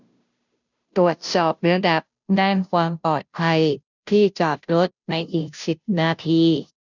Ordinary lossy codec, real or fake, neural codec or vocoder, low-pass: none; fake; codec, 16 kHz, 0.5 kbps, FunCodec, trained on Chinese and English, 25 frames a second; 7.2 kHz